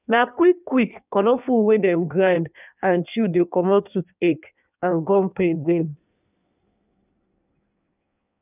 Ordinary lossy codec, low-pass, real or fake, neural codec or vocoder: none; 3.6 kHz; fake; codec, 16 kHz in and 24 kHz out, 1.1 kbps, FireRedTTS-2 codec